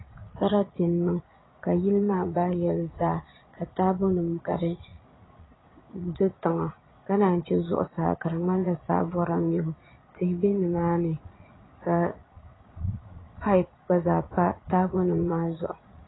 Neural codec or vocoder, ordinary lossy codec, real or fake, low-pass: vocoder, 22.05 kHz, 80 mel bands, Vocos; AAC, 16 kbps; fake; 7.2 kHz